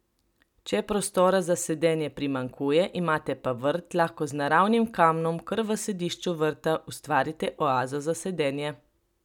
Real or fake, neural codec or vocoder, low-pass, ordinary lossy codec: real; none; 19.8 kHz; none